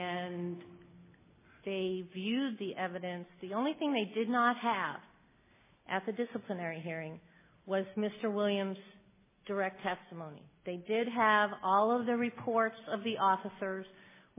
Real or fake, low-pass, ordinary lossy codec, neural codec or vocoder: real; 3.6 kHz; MP3, 16 kbps; none